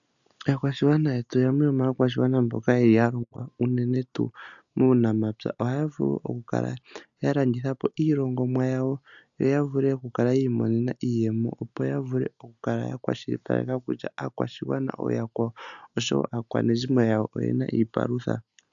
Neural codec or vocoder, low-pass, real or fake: none; 7.2 kHz; real